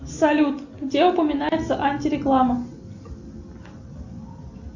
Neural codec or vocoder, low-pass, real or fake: none; 7.2 kHz; real